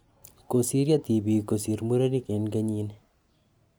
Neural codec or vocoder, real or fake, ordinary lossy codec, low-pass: none; real; none; none